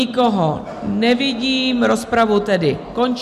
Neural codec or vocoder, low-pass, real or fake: none; 14.4 kHz; real